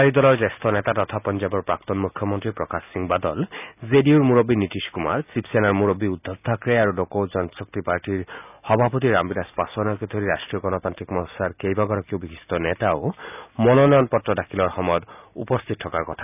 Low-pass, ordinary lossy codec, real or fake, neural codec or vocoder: 3.6 kHz; none; real; none